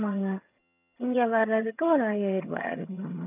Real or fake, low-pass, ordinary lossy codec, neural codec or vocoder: fake; 3.6 kHz; none; vocoder, 22.05 kHz, 80 mel bands, HiFi-GAN